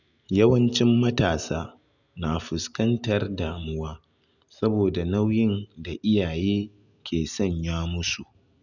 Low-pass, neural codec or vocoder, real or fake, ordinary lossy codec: 7.2 kHz; none; real; none